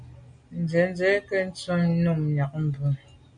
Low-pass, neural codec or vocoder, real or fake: 9.9 kHz; none; real